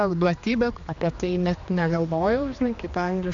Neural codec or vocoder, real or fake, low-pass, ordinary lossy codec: codec, 16 kHz, 2 kbps, X-Codec, HuBERT features, trained on general audio; fake; 7.2 kHz; AAC, 64 kbps